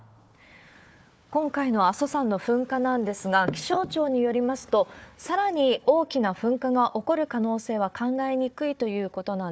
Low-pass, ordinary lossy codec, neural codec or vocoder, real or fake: none; none; codec, 16 kHz, 4 kbps, FunCodec, trained on Chinese and English, 50 frames a second; fake